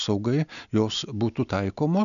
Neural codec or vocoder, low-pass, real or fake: none; 7.2 kHz; real